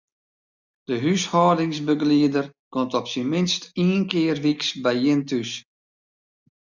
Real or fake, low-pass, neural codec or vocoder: real; 7.2 kHz; none